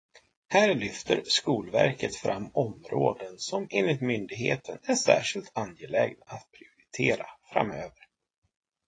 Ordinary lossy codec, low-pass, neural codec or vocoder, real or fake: AAC, 32 kbps; 9.9 kHz; none; real